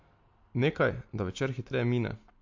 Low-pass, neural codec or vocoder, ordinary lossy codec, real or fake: 7.2 kHz; none; MP3, 48 kbps; real